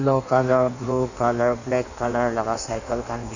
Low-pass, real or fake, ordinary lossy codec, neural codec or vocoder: 7.2 kHz; fake; none; codec, 16 kHz in and 24 kHz out, 1.1 kbps, FireRedTTS-2 codec